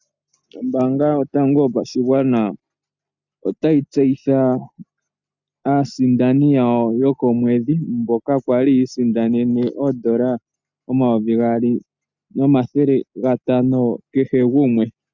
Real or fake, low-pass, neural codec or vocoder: real; 7.2 kHz; none